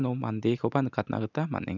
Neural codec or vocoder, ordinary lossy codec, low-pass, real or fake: none; none; 7.2 kHz; real